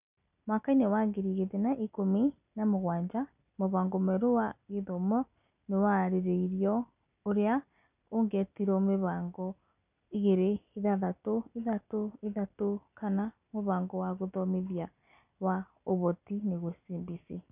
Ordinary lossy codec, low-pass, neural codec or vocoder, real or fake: none; 3.6 kHz; none; real